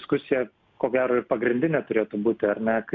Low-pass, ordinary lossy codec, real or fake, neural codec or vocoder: 7.2 kHz; MP3, 64 kbps; real; none